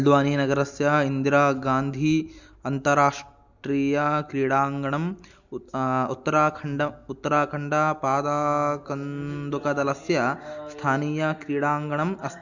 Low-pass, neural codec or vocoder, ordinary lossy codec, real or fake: 7.2 kHz; none; Opus, 64 kbps; real